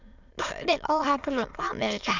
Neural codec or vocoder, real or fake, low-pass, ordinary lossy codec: autoencoder, 22.05 kHz, a latent of 192 numbers a frame, VITS, trained on many speakers; fake; 7.2 kHz; Opus, 64 kbps